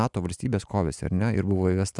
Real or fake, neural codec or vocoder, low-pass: real; none; 10.8 kHz